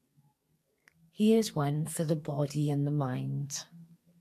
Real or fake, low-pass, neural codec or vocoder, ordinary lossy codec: fake; 14.4 kHz; codec, 44.1 kHz, 2.6 kbps, SNAC; AAC, 64 kbps